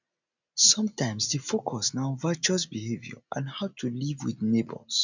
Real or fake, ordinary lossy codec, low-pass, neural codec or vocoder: real; none; 7.2 kHz; none